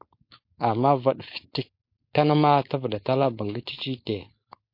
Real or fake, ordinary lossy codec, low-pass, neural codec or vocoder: fake; MP3, 32 kbps; 5.4 kHz; codec, 16 kHz, 4.8 kbps, FACodec